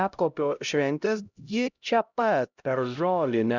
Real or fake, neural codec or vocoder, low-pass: fake; codec, 16 kHz, 0.5 kbps, X-Codec, HuBERT features, trained on LibriSpeech; 7.2 kHz